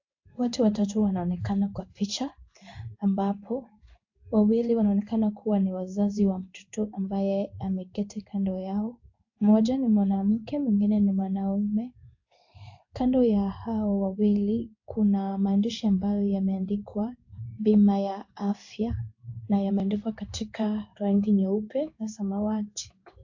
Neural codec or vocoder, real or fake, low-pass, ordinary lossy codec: codec, 16 kHz in and 24 kHz out, 1 kbps, XY-Tokenizer; fake; 7.2 kHz; AAC, 48 kbps